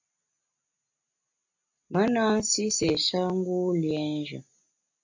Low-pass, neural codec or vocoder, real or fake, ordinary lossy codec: 7.2 kHz; none; real; AAC, 48 kbps